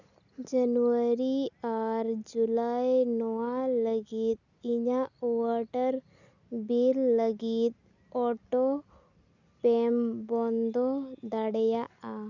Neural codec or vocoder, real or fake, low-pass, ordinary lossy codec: none; real; 7.2 kHz; none